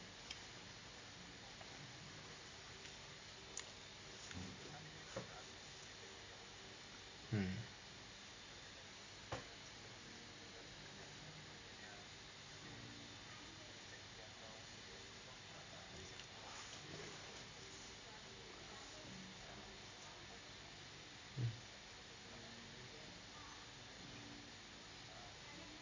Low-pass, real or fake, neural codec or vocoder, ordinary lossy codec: 7.2 kHz; real; none; MP3, 64 kbps